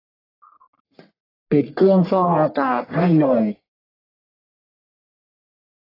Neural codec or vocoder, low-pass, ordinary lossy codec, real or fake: codec, 44.1 kHz, 1.7 kbps, Pupu-Codec; 5.4 kHz; AAC, 24 kbps; fake